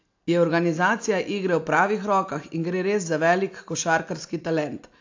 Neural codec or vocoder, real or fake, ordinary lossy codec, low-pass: none; real; AAC, 48 kbps; 7.2 kHz